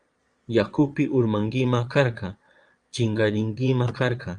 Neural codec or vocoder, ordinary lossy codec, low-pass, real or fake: vocoder, 22.05 kHz, 80 mel bands, Vocos; Opus, 32 kbps; 9.9 kHz; fake